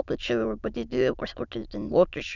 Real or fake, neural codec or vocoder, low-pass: fake; autoencoder, 22.05 kHz, a latent of 192 numbers a frame, VITS, trained on many speakers; 7.2 kHz